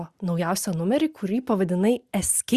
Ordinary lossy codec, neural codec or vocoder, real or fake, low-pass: Opus, 64 kbps; none; real; 14.4 kHz